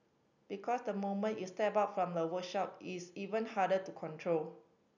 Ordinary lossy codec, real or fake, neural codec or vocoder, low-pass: none; real; none; 7.2 kHz